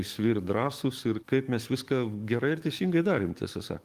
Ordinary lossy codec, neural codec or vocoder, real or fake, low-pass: Opus, 32 kbps; codec, 44.1 kHz, 7.8 kbps, DAC; fake; 14.4 kHz